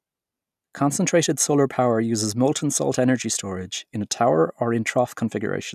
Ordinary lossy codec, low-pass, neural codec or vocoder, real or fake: none; 14.4 kHz; none; real